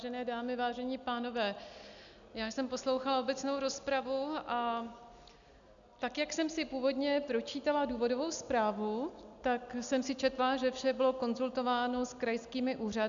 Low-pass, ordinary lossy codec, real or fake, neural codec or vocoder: 7.2 kHz; MP3, 96 kbps; real; none